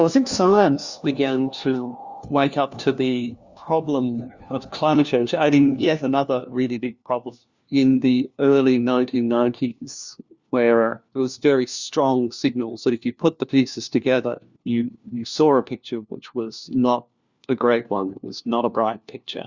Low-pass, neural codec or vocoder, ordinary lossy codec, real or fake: 7.2 kHz; codec, 16 kHz, 1 kbps, FunCodec, trained on LibriTTS, 50 frames a second; Opus, 64 kbps; fake